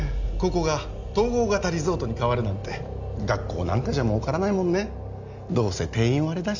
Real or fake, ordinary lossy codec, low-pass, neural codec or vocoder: real; none; 7.2 kHz; none